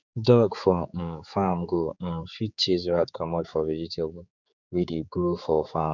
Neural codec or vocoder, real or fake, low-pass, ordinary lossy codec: codec, 16 kHz, 4 kbps, X-Codec, HuBERT features, trained on general audio; fake; 7.2 kHz; none